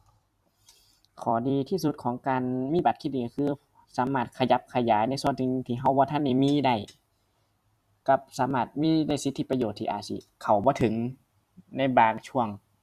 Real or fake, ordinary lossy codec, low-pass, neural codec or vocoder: fake; Opus, 64 kbps; 14.4 kHz; vocoder, 44.1 kHz, 128 mel bands every 256 samples, BigVGAN v2